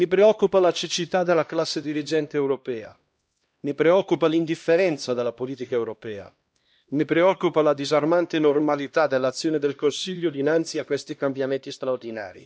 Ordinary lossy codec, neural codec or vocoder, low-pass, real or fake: none; codec, 16 kHz, 1 kbps, X-Codec, HuBERT features, trained on LibriSpeech; none; fake